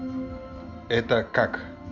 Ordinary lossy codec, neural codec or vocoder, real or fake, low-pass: none; none; real; 7.2 kHz